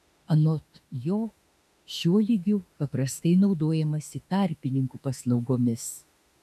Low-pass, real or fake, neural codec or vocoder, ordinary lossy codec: 14.4 kHz; fake; autoencoder, 48 kHz, 32 numbers a frame, DAC-VAE, trained on Japanese speech; MP3, 96 kbps